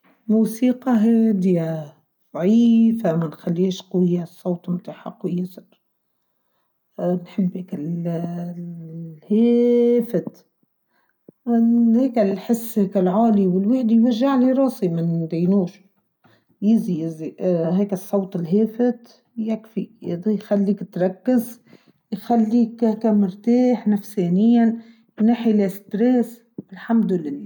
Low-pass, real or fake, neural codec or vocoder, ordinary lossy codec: 19.8 kHz; real; none; none